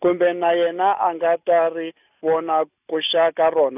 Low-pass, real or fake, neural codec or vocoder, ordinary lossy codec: 3.6 kHz; real; none; none